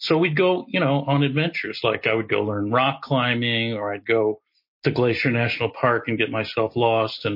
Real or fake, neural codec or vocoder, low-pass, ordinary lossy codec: real; none; 5.4 kHz; MP3, 32 kbps